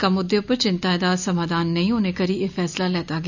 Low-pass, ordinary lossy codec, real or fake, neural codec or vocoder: 7.2 kHz; none; real; none